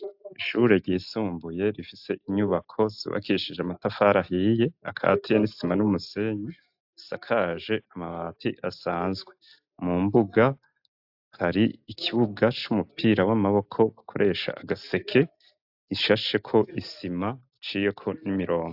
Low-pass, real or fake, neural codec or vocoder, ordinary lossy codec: 5.4 kHz; real; none; AAC, 48 kbps